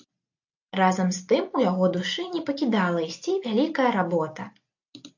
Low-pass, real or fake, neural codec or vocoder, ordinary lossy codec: 7.2 kHz; real; none; AAC, 48 kbps